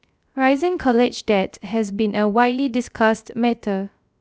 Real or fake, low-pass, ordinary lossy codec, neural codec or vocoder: fake; none; none; codec, 16 kHz, 0.3 kbps, FocalCodec